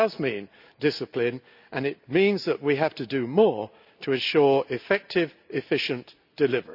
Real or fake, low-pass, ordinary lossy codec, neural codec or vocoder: real; 5.4 kHz; none; none